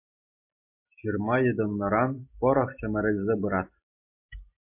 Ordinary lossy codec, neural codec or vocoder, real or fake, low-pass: MP3, 32 kbps; none; real; 3.6 kHz